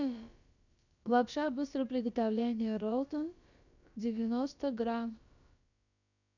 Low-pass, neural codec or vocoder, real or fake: 7.2 kHz; codec, 16 kHz, about 1 kbps, DyCAST, with the encoder's durations; fake